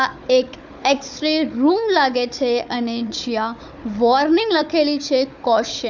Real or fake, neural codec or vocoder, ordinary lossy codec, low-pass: fake; codec, 16 kHz, 4 kbps, FunCodec, trained on Chinese and English, 50 frames a second; none; 7.2 kHz